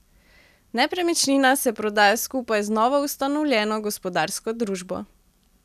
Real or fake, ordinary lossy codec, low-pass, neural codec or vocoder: real; none; 14.4 kHz; none